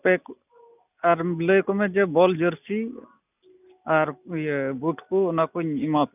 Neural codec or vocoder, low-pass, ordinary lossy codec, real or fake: none; 3.6 kHz; none; real